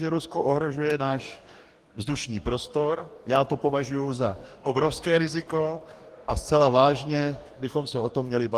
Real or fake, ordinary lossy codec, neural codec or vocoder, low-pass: fake; Opus, 24 kbps; codec, 44.1 kHz, 2.6 kbps, DAC; 14.4 kHz